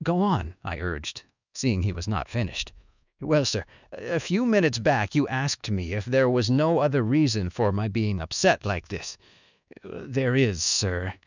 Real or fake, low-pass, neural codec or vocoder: fake; 7.2 kHz; codec, 24 kHz, 1.2 kbps, DualCodec